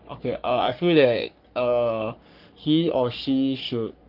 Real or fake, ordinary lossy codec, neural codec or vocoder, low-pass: fake; Opus, 24 kbps; codec, 44.1 kHz, 3.4 kbps, Pupu-Codec; 5.4 kHz